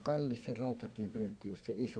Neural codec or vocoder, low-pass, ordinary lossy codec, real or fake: codec, 24 kHz, 1 kbps, SNAC; 9.9 kHz; none; fake